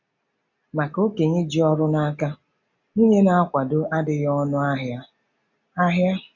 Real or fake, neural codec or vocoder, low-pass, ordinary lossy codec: real; none; 7.2 kHz; none